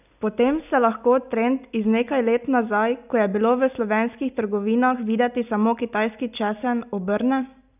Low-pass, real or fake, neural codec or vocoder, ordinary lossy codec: 3.6 kHz; real; none; none